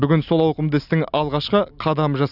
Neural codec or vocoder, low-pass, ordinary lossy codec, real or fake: none; 5.4 kHz; none; real